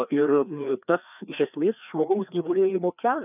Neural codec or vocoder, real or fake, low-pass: codec, 16 kHz, 2 kbps, FreqCodec, larger model; fake; 3.6 kHz